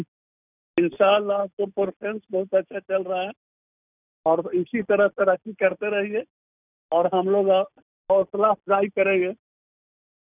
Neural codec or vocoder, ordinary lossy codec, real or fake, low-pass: none; none; real; 3.6 kHz